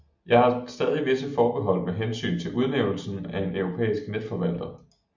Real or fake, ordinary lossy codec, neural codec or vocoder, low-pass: real; MP3, 64 kbps; none; 7.2 kHz